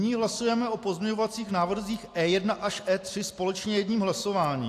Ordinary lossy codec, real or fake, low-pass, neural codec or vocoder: AAC, 64 kbps; real; 14.4 kHz; none